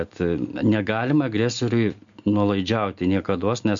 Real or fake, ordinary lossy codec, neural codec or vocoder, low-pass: real; MP3, 64 kbps; none; 7.2 kHz